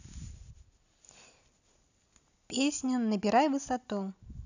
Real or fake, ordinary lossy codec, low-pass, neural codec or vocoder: real; none; 7.2 kHz; none